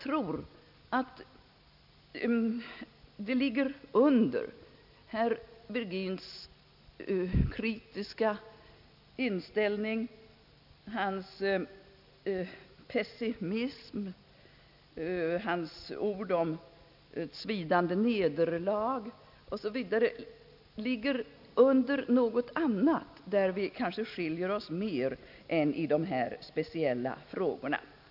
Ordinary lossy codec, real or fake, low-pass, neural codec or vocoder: none; real; 5.4 kHz; none